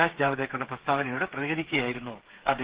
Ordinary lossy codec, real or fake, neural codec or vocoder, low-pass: Opus, 16 kbps; fake; codec, 16 kHz, 8 kbps, FreqCodec, smaller model; 3.6 kHz